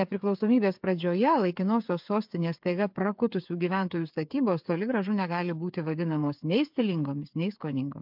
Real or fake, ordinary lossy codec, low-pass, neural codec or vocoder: fake; MP3, 48 kbps; 5.4 kHz; codec, 16 kHz, 8 kbps, FreqCodec, smaller model